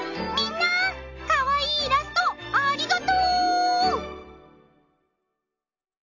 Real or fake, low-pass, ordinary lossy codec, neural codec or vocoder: real; 7.2 kHz; none; none